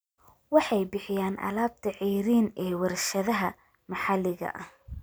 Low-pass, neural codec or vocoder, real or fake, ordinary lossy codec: none; none; real; none